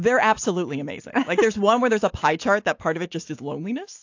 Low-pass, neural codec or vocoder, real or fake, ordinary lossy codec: 7.2 kHz; none; real; AAC, 48 kbps